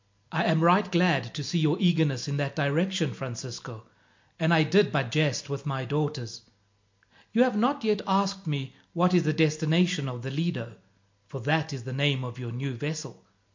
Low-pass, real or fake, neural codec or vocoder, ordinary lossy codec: 7.2 kHz; real; none; MP3, 48 kbps